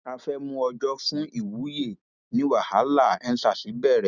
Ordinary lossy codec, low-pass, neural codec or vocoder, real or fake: none; 7.2 kHz; none; real